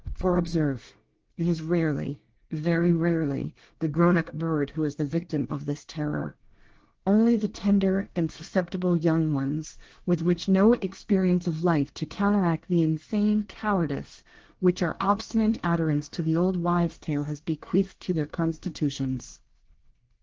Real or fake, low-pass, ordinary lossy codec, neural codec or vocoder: fake; 7.2 kHz; Opus, 16 kbps; codec, 24 kHz, 1 kbps, SNAC